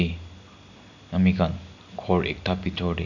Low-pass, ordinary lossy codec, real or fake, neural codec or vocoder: 7.2 kHz; none; real; none